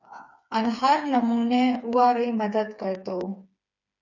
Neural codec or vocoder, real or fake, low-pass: codec, 16 kHz, 4 kbps, FreqCodec, smaller model; fake; 7.2 kHz